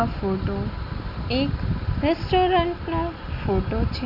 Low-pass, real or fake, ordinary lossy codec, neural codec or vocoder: 5.4 kHz; real; none; none